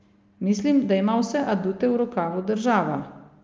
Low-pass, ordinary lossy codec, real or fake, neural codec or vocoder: 7.2 kHz; Opus, 24 kbps; real; none